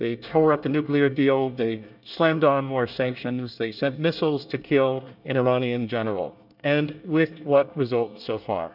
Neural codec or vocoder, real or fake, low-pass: codec, 24 kHz, 1 kbps, SNAC; fake; 5.4 kHz